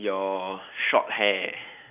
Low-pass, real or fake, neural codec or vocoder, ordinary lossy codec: 3.6 kHz; real; none; none